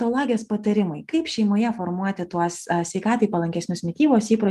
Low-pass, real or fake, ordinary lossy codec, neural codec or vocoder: 14.4 kHz; real; Opus, 32 kbps; none